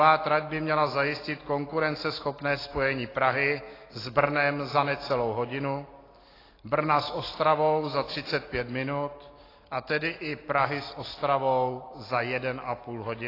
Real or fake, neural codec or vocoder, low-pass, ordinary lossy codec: real; none; 5.4 kHz; AAC, 24 kbps